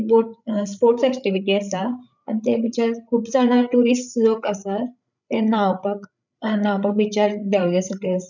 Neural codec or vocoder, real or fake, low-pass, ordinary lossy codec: codec, 16 kHz, 8 kbps, FreqCodec, larger model; fake; 7.2 kHz; none